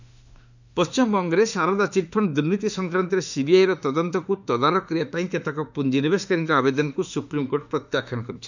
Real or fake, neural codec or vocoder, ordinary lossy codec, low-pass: fake; autoencoder, 48 kHz, 32 numbers a frame, DAC-VAE, trained on Japanese speech; none; 7.2 kHz